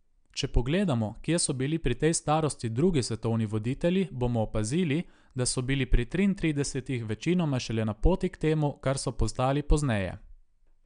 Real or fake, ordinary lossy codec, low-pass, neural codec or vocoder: real; none; 9.9 kHz; none